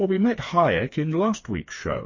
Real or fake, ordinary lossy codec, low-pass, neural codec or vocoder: fake; MP3, 32 kbps; 7.2 kHz; codec, 16 kHz, 4 kbps, FreqCodec, smaller model